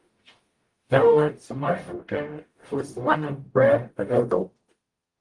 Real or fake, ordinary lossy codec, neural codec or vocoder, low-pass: fake; Opus, 24 kbps; codec, 44.1 kHz, 0.9 kbps, DAC; 10.8 kHz